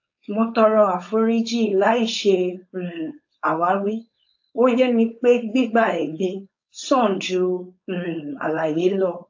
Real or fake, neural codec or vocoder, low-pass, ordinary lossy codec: fake; codec, 16 kHz, 4.8 kbps, FACodec; 7.2 kHz; AAC, 48 kbps